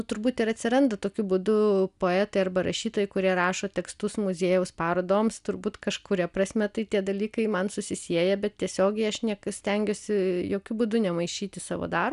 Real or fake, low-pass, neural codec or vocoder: real; 10.8 kHz; none